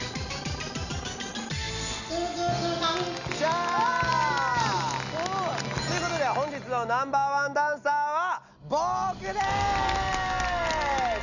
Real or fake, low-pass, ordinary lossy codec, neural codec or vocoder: real; 7.2 kHz; none; none